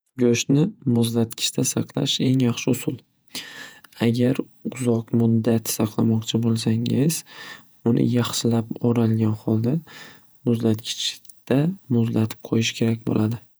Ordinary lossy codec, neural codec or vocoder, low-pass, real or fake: none; vocoder, 48 kHz, 128 mel bands, Vocos; none; fake